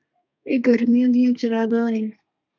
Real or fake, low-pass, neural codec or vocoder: fake; 7.2 kHz; codec, 32 kHz, 1.9 kbps, SNAC